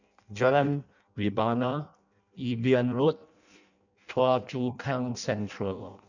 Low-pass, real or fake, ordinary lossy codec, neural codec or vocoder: 7.2 kHz; fake; none; codec, 16 kHz in and 24 kHz out, 0.6 kbps, FireRedTTS-2 codec